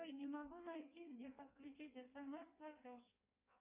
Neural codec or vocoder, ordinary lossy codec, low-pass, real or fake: codec, 16 kHz in and 24 kHz out, 1.1 kbps, FireRedTTS-2 codec; Opus, 64 kbps; 3.6 kHz; fake